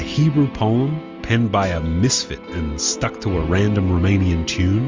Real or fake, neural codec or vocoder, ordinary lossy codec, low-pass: real; none; Opus, 32 kbps; 7.2 kHz